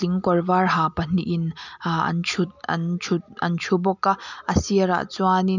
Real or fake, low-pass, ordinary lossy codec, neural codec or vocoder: real; 7.2 kHz; none; none